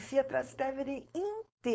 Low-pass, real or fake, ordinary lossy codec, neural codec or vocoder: none; fake; none; codec, 16 kHz, 4.8 kbps, FACodec